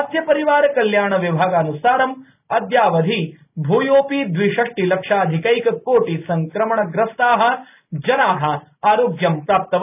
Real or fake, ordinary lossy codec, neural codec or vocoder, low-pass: real; none; none; 3.6 kHz